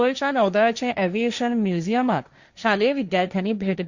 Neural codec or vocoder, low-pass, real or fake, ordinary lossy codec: codec, 16 kHz, 1.1 kbps, Voila-Tokenizer; 7.2 kHz; fake; Opus, 64 kbps